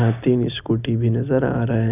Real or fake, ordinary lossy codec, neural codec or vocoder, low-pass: real; none; none; 3.6 kHz